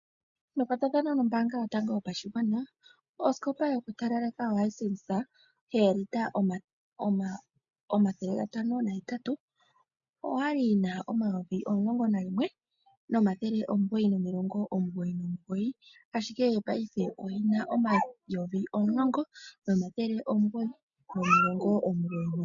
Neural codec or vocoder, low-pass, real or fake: none; 7.2 kHz; real